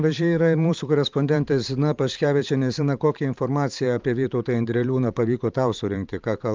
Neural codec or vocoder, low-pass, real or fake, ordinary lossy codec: vocoder, 44.1 kHz, 128 mel bands every 512 samples, BigVGAN v2; 7.2 kHz; fake; Opus, 24 kbps